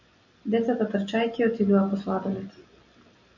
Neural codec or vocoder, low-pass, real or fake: none; 7.2 kHz; real